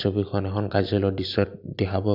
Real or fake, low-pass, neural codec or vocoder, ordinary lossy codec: real; 5.4 kHz; none; none